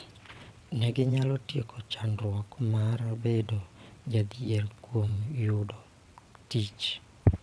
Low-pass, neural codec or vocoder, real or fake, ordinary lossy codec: none; vocoder, 22.05 kHz, 80 mel bands, WaveNeXt; fake; none